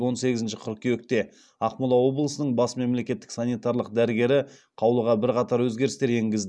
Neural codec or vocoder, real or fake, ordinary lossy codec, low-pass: none; real; none; none